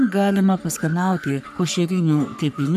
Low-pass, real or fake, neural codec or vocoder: 14.4 kHz; fake; codec, 44.1 kHz, 3.4 kbps, Pupu-Codec